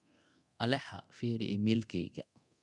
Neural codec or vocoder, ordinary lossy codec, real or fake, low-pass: codec, 24 kHz, 0.9 kbps, DualCodec; none; fake; 10.8 kHz